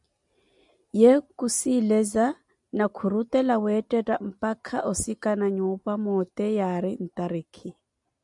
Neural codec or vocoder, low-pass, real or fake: none; 10.8 kHz; real